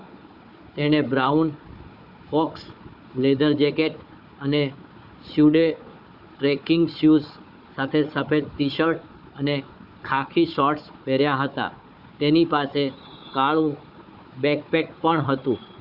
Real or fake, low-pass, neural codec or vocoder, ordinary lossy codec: fake; 5.4 kHz; codec, 16 kHz, 4 kbps, FunCodec, trained on Chinese and English, 50 frames a second; none